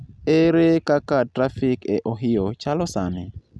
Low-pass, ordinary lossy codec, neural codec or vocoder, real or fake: none; none; none; real